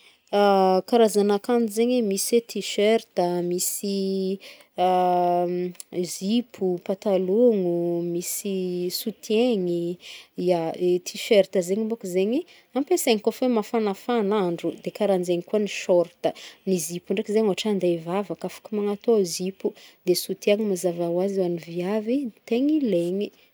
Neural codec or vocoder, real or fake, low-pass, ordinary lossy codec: none; real; none; none